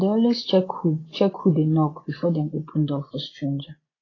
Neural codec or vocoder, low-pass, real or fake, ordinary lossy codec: vocoder, 24 kHz, 100 mel bands, Vocos; 7.2 kHz; fake; AAC, 32 kbps